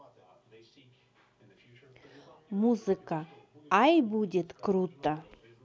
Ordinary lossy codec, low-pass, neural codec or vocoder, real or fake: none; none; none; real